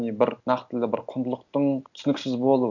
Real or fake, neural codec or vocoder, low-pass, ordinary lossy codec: real; none; 7.2 kHz; none